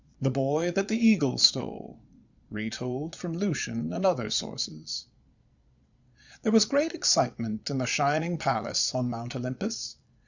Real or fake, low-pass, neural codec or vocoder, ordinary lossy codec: fake; 7.2 kHz; vocoder, 22.05 kHz, 80 mel bands, Vocos; Opus, 64 kbps